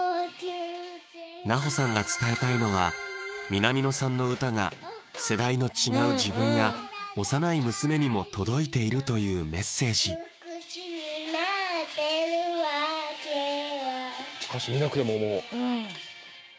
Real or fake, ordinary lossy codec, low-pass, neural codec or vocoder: fake; none; none; codec, 16 kHz, 6 kbps, DAC